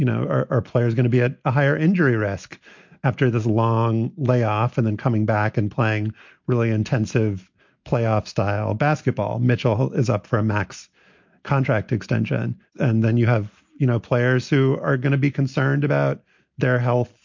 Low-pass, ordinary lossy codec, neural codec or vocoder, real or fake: 7.2 kHz; MP3, 48 kbps; none; real